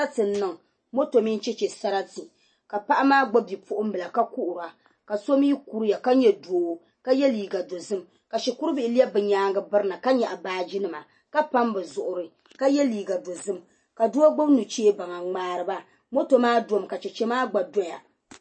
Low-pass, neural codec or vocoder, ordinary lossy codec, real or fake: 9.9 kHz; none; MP3, 32 kbps; real